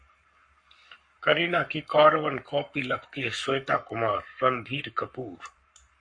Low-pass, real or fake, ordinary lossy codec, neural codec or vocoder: 9.9 kHz; fake; MP3, 48 kbps; codec, 44.1 kHz, 7.8 kbps, Pupu-Codec